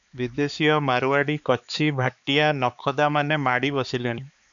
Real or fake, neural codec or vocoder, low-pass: fake; codec, 16 kHz, 4 kbps, X-Codec, HuBERT features, trained on balanced general audio; 7.2 kHz